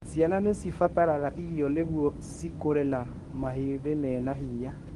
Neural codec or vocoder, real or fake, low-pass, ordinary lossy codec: codec, 24 kHz, 0.9 kbps, WavTokenizer, medium speech release version 1; fake; 10.8 kHz; Opus, 24 kbps